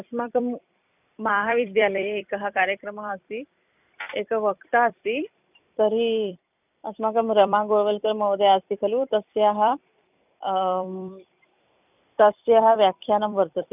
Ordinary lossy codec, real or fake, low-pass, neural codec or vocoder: none; fake; 3.6 kHz; vocoder, 44.1 kHz, 128 mel bands every 256 samples, BigVGAN v2